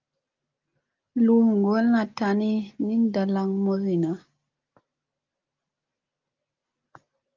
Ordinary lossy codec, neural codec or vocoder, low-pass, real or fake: Opus, 32 kbps; none; 7.2 kHz; real